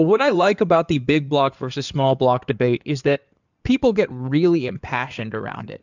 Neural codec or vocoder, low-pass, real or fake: codec, 16 kHz in and 24 kHz out, 2.2 kbps, FireRedTTS-2 codec; 7.2 kHz; fake